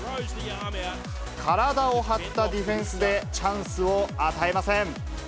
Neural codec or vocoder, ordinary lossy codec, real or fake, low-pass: none; none; real; none